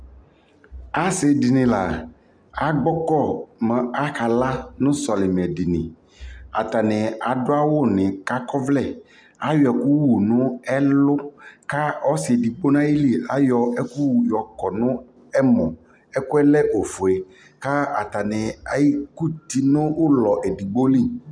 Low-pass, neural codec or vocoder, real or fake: 9.9 kHz; none; real